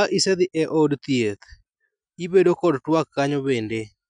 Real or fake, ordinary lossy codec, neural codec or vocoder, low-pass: real; none; none; 9.9 kHz